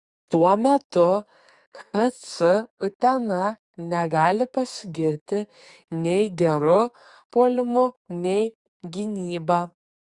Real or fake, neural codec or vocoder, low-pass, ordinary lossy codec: fake; codec, 44.1 kHz, 2.6 kbps, SNAC; 10.8 kHz; Opus, 64 kbps